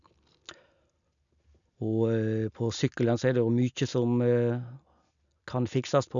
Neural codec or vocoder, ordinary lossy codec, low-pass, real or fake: none; none; 7.2 kHz; real